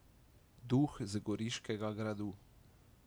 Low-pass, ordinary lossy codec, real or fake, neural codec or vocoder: none; none; real; none